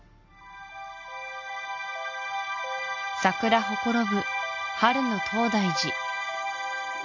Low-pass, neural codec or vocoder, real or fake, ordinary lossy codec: 7.2 kHz; none; real; none